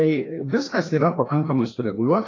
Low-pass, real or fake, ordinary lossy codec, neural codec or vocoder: 7.2 kHz; fake; AAC, 32 kbps; codec, 16 kHz, 1 kbps, FreqCodec, larger model